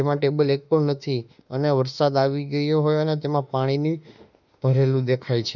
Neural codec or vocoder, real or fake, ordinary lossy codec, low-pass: autoencoder, 48 kHz, 32 numbers a frame, DAC-VAE, trained on Japanese speech; fake; none; 7.2 kHz